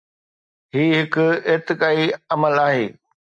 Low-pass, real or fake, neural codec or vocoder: 9.9 kHz; real; none